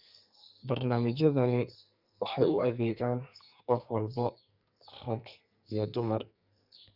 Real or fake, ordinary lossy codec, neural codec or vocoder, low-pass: fake; Opus, 64 kbps; codec, 44.1 kHz, 2.6 kbps, SNAC; 5.4 kHz